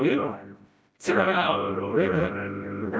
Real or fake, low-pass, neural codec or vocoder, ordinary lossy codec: fake; none; codec, 16 kHz, 0.5 kbps, FreqCodec, smaller model; none